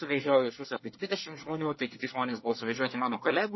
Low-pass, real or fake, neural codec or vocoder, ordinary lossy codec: 7.2 kHz; fake; codec, 24 kHz, 1 kbps, SNAC; MP3, 24 kbps